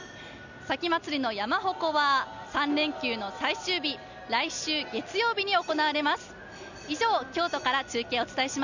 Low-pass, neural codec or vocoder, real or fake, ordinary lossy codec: 7.2 kHz; none; real; none